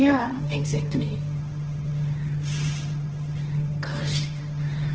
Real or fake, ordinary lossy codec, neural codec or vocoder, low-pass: fake; Opus, 16 kbps; codec, 16 kHz, 1.1 kbps, Voila-Tokenizer; 7.2 kHz